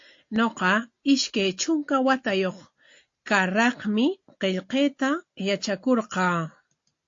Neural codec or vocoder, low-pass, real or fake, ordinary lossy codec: none; 7.2 kHz; real; AAC, 48 kbps